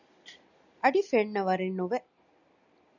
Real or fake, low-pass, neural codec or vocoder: real; 7.2 kHz; none